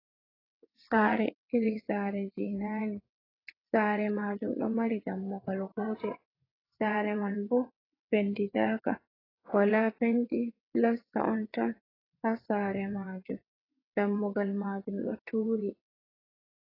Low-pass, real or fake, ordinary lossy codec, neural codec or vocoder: 5.4 kHz; fake; AAC, 24 kbps; vocoder, 22.05 kHz, 80 mel bands, WaveNeXt